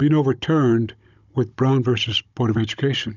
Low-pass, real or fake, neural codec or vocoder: 7.2 kHz; fake; codec, 16 kHz, 16 kbps, FunCodec, trained on Chinese and English, 50 frames a second